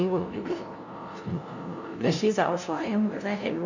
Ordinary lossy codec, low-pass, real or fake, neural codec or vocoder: none; 7.2 kHz; fake; codec, 16 kHz, 0.5 kbps, FunCodec, trained on LibriTTS, 25 frames a second